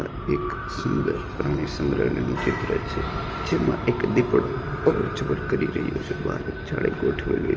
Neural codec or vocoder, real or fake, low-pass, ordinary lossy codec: none; real; 7.2 kHz; Opus, 24 kbps